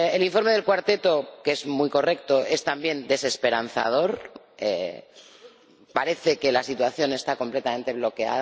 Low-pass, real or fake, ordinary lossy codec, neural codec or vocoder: none; real; none; none